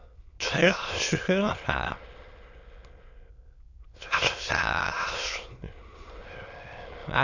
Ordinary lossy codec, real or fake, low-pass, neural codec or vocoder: AAC, 48 kbps; fake; 7.2 kHz; autoencoder, 22.05 kHz, a latent of 192 numbers a frame, VITS, trained on many speakers